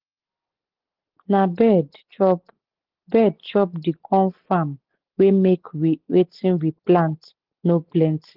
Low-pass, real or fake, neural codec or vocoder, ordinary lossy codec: 5.4 kHz; real; none; Opus, 16 kbps